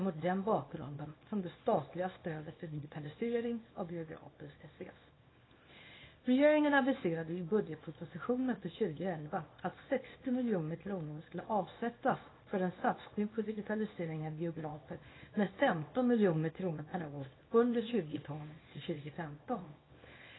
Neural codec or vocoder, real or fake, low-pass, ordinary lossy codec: codec, 24 kHz, 0.9 kbps, WavTokenizer, small release; fake; 7.2 kHz; AAC, 16 kbps